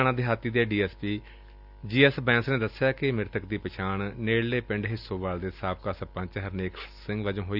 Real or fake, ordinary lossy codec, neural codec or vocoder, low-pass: real; none; none; 5.4 kHz